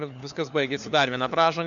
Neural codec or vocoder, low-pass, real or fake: codec, 16 kHz, 4 kbps, FunCodec, trained on LibriTTS, 50 frames a second; 7.2 kHz; fake